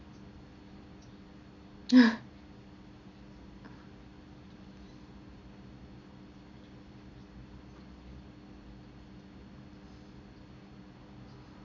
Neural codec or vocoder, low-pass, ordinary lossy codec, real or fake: none; 7.2 kHz; none; real